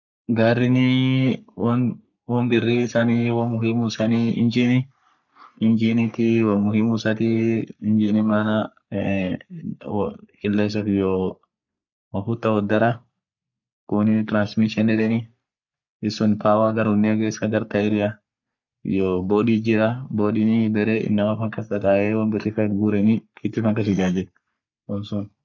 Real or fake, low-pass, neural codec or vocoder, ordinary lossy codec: fake; 7.2 kHz; codec, 44.1 kHz, 3.4 kbps, Pupu-Codec; none